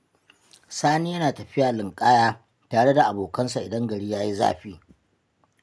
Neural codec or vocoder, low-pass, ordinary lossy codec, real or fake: none; none; none; real